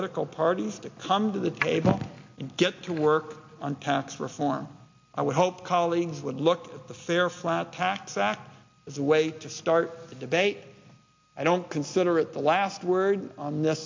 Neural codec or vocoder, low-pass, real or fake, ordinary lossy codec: none; 7.2 kHz; real; MP3, 48 kbps